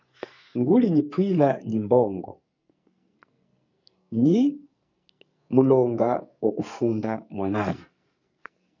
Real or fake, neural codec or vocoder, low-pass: fake; codec, 44.1 kHz, 2.6 kbps, SNAC; 7.2 kHz